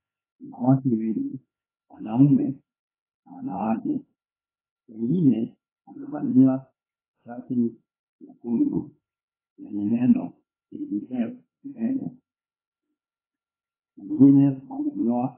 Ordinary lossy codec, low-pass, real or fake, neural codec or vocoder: AAC, 16 kbps; 3.6 kHz; fake; codec, 16 kHz, 4 kbps, X-Codec, HuBERT features, trained on LibriSpeech